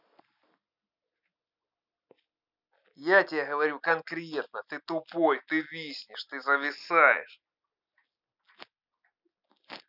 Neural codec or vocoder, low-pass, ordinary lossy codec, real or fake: none; 5.4 kHz; none; real